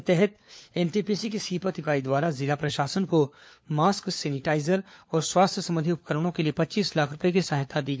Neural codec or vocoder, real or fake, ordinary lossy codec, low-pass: codec, 16 kHz, 4 kbps, FunCodec, trained on LibriTTS, 50 frames a second; fake; none; none